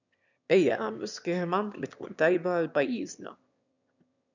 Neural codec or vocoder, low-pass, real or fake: autoencoder, 22.05 kHz, a latent of 192 numbers a frame, VITS, trained on one speaker; 7.2 kHz; fake